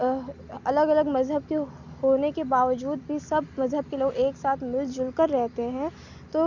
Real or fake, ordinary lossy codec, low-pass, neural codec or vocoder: real; none; 7.2 kHz; none